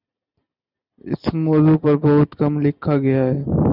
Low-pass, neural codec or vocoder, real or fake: 5.4 kHz; none; real